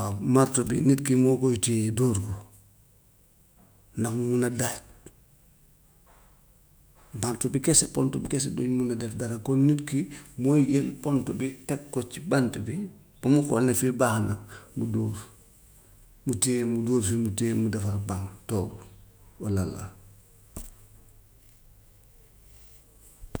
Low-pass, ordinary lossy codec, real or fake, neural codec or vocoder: none; none; fake; autoencoder, 48 kHz, 128 numbers a frame, DAC-VAE, trained on Japanese speech